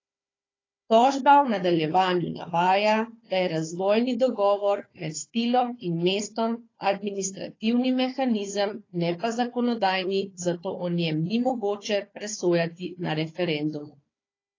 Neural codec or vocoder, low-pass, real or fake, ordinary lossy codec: codec, 16 kHz, 4 kbps, FunCodec, trained on Chinese and English, 50 frames a second; 7.2 kHz; fake; AAC, 32 kbps